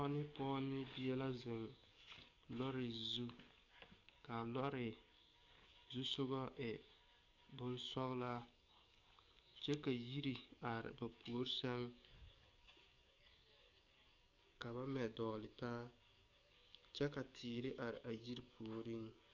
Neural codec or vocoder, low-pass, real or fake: codec, 44.1 kHz, 7.8 kbps, DAC; 7.2 kHz; fake